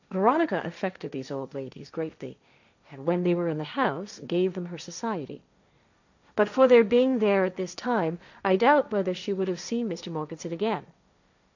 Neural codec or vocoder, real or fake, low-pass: codec, 16 kHz, 1.1 kbps, Voila-Tokenizer; fake; 7.2 kHz